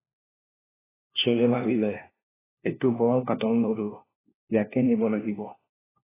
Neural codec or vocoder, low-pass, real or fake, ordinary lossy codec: codec, 16 kHz, 1 kbps, FunCodec, trained on LibriTTS, 50 frames a second; 3.6 kHz; fake; AAC, 16 kbps